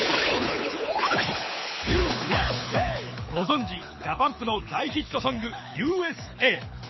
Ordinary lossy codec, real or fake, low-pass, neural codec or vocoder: MP3, 24 kbps; fake; 7.2 kHz; codec, 24 kHz, 6 kbps, HILCodec